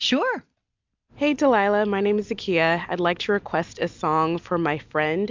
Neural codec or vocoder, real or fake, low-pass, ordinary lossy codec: none; real; 7.2 kHz; MP3, 64 kbps